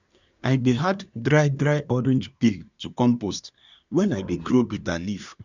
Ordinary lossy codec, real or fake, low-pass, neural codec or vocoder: none; fake; 7.2 kHz; codec, 24 kHz, 1 kbps, SNAC